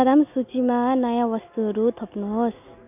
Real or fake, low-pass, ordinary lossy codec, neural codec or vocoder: real; 3.6 kHz; none; none